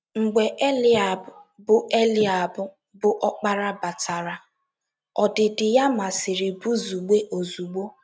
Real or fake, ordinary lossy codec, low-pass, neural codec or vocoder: real; none; none; none